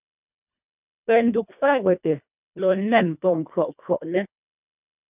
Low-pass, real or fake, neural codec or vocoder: 3.6 kHz; fake; codec, 24 kHz, 1.5 kbps, HILCodec